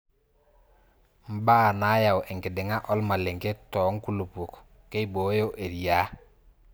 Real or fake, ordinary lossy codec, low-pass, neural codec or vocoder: real; none; none; none